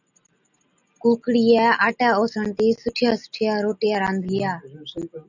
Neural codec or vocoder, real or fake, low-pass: none; real; 7.2 kHz